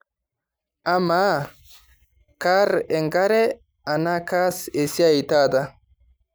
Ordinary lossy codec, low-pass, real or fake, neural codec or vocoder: none; none; real; none